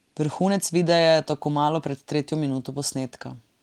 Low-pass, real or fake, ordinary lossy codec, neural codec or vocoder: 19.8 kHz; real; Opus, 24 kbps; none